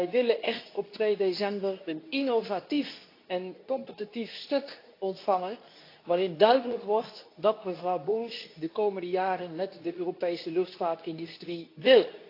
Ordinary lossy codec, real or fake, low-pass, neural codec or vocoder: AAC, 32 kbps; fake; 5.4 kHz; codec, 24 kHz, 0.9 kbps, WavTokenizer, medium speech release version 2